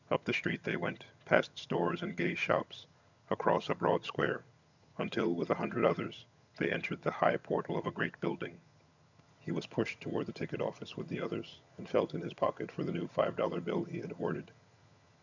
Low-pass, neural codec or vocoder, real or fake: 7.2 kHz; vocoder, 22.05 kHz, 80 mel bands, HiFi-GAN; fake